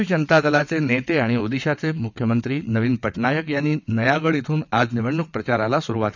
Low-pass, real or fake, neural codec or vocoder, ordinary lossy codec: 7.2 kHz; fake; vocoder, 22.05 kHz, 80 mel bands, WaveNeXt; none